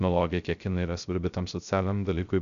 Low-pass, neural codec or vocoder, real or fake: 7.2 kHz; codec, 16 kHz, 0.3 kbps, FocalCodec; fake